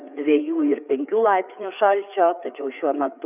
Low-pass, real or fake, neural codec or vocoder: 3.6 kHz; fake; codec, 16 kHz, 4 kbps, FreqCodec, larger model